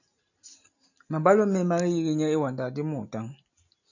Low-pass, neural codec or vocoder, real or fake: 7.2 kHz; none; real